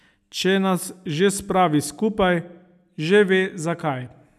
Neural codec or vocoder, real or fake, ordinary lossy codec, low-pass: none; real; none; 14.4 kHz